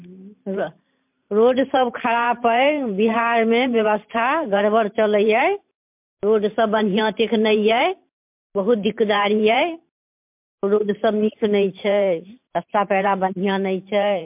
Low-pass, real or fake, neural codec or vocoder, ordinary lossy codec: 3.6 kHz; fake; vocoder, 44.1 kHz, 128 mel bands every 512 samples, BigVGAN v2; MP3, 32 kbps